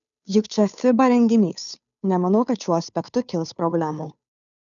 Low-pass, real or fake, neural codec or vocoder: 7.2 kHz; fake; codec, 16 kHz, 2 kbps, FunCodec, trained on Chinese and English, 25 frames a second